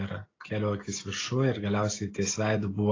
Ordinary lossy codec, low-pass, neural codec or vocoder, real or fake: AAC, 32 kbps; 7.2 kHz; none; real